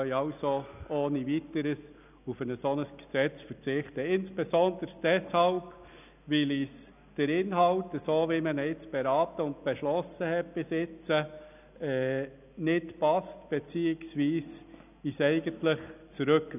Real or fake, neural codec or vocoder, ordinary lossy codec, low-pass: real; none; none; 3.6 kHz